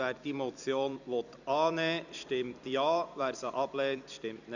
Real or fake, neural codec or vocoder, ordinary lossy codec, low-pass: fake; vocoder, 44.1 kHz, 128 mel bands every 256 samples, BigVGAN v2; none; 7.2 kHz